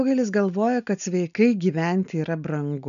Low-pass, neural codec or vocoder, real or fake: 7.2 kHz; none; real